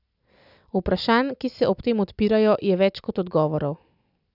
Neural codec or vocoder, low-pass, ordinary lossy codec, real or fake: none; 5.4 kHz; none; real